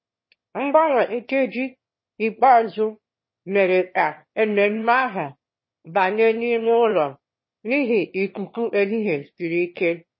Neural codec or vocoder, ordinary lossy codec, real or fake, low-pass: autoencoder, 22.05 kHz, a latent of 192 numbers a frame, VITS, trained on one speaker; MP3, 24 kbps; fake; 7.2 kHz